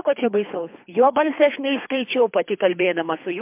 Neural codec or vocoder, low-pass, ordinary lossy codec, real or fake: codec, 24 kHz, 3 kbps, HILCodec; 3.6 kHz; MP3, 32 kbps; fake